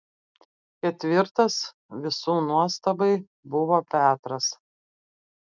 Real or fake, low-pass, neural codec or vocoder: real; 7.2 kHz; none